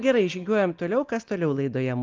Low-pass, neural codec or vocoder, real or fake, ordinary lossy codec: 7.2 kHz; none; real; Opus, 24 kbps